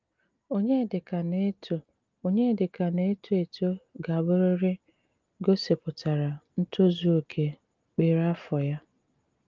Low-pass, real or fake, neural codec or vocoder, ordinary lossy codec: 7.2 kHz; real; none; Opus, 32 kbps